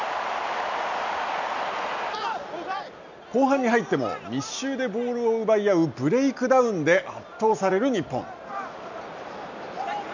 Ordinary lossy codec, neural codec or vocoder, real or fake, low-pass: none; none; real; 7.2 kHz